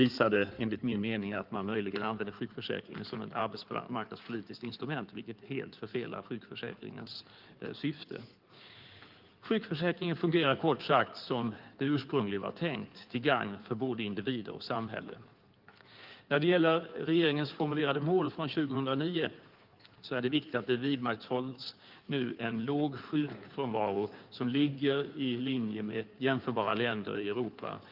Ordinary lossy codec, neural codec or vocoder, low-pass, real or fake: Opus, 32 kbps; codec, 16 kHz in and 24 kHz out, 2.2 kbps, FireRedTTS-2 codec; 5.4 kHz; fake